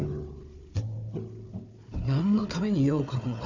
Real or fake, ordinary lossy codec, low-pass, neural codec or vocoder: fake; none; 7.2 kHz; codec, 16 kHz, 4 kbps, FunCodec, trained on Chinese and English, 50 frames a second